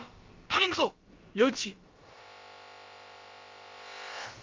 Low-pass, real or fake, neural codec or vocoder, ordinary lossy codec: 7.2 kHz; fake; codec, 16 kHz, about 1 kbps, DyCAST, with the encoder's durations; Opus, 32 kbps